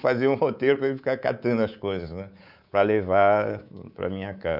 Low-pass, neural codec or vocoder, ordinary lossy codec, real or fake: 5.4 kHz; codec, 24 kHz, 3.1 kbps, DualCodec; none; fake